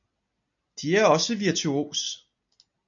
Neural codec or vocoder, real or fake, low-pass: none; real; 7.2 kHz